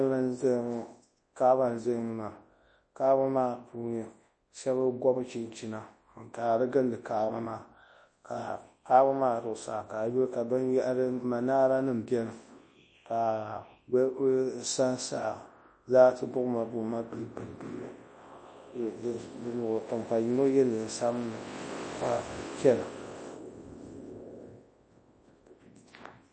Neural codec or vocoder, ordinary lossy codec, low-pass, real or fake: codec, 24 kHz, 0.9 kbps, WavTokenizer, large speech release; MP3, 32 kbps; 9.9 kHz; fake